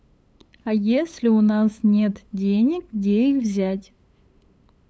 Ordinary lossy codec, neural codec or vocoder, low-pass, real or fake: none; codec, 16 kHz, 8 kbps, FunCodec, trained on LibriTTS, 25 frames a second; none; fake